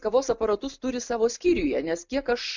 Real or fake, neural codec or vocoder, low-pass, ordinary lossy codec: real; none; 7.2 kHz; MP3, 64 kbps